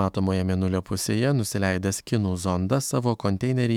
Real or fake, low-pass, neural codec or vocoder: fake; 19.8 kHz; autoencoder, 48 kHz, 128 numbers a frame, DAC-VAE, trained on Japanese speech